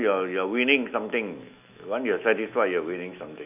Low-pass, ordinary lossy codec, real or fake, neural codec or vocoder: 3.6 kHz; none; fake; autoencoder, 48 kHz, 128 numbers a frame, DAC-VAE, trained on Japanese speech